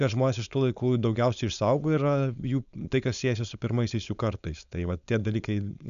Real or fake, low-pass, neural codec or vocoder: fake; 7.2 kHz; codec, 16 kHz, 4.8 kbps, FACodec